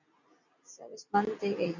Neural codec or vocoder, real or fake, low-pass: none; real; 7.2 kHz